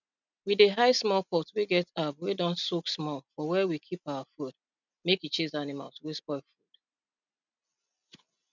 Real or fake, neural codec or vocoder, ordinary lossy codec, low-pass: real; none; none; 7.2 kHz